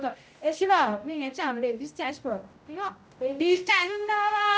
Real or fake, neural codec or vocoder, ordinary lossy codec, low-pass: fake; codec, 16 kHz, 0.5 kbps, X-Codec, HuBERT features, trained on general audio; none; none